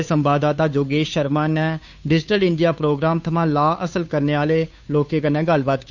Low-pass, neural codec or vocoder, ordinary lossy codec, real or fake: 7.2 kHz; codec, 16 kHz, 2 kbps, FunCodec, trained on Chinese and English, 25 frames a second; none; fake